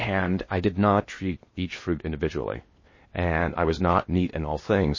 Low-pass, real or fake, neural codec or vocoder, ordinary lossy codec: 7.2 kHz; fake; codec, 16 kHz in and 24 kHz out, 0.8 kbps, FocalCodec, streaming, 65536 codes; MP3, 32 kbps